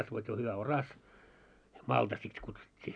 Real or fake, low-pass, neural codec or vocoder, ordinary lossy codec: real; 9.9 kHz; none; none